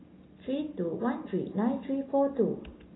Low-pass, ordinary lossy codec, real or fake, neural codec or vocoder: 7.2 kHz; AAC, 16 kbps; real; none